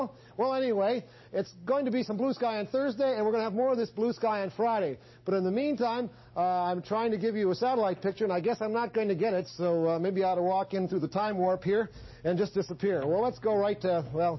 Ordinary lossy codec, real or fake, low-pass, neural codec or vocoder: MP3, 24 kbps; real; 7.2 kHz; none